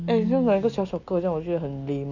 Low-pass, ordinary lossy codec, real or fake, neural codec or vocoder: 7.2 kHz; none; real; none